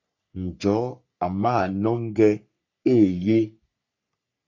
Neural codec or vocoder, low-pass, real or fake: codec, 44.1 kHz, 3.4 kbps, Pupu-Codec; 7.2 kHz; fake